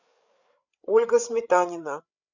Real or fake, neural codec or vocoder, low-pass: fake; codec, 16 kHz, 8 kbps, FreqCodec, larger model; 7.2 kHz